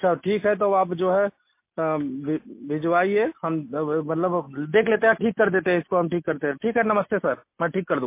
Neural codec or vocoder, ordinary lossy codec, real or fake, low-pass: none; MP3, 24 kbps; real; 3.6 kHz